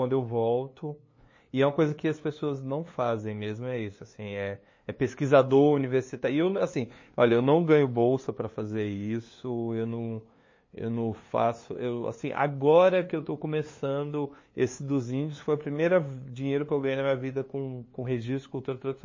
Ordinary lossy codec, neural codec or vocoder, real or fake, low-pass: MP3, 32 kbps; codec, 16 kHz, 2 kbps, FunCodec, trained on LibriTTS, 25 frames a second; fake; 7.2 kHz